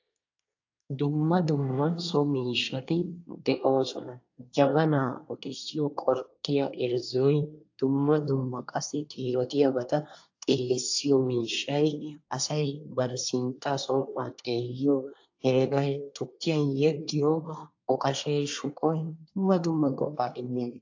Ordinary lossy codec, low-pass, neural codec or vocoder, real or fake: AAC, 48 kbps; 7.2 kHz; codec, 24 kHz, 1 kbps, SNAC; fake